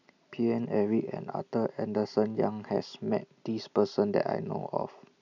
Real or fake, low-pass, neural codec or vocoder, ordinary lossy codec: real; 7.2 kHz; none; none